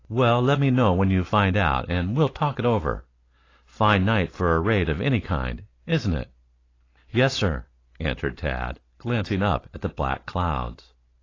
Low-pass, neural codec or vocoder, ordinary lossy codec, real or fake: 7.2 kHz; none; AAC, 32 kbps; real